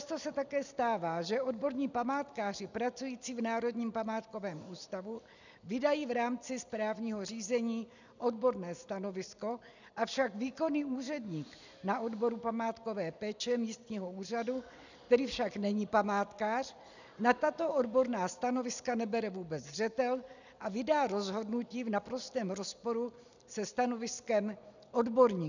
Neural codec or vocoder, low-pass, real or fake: none; 7.2 kHz; real